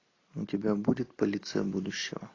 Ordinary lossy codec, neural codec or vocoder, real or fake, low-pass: AAC, 32 kbps; none; real; 7.2 kHz